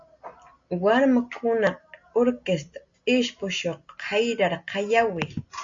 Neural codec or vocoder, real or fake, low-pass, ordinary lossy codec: none; real; 7.2 kHz; MP3, 48 kbps